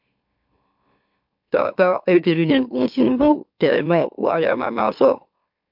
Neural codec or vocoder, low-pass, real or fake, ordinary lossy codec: autoencoder, 44.1 kHz, a latent of 192 numbers a frame, MeloTTS; 5.4 kHz; fake; MP3, 48 kbps